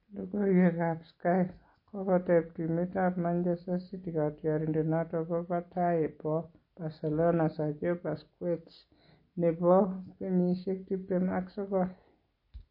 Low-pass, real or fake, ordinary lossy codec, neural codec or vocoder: 5.4 kHz; real; MP3, 32 kbps; none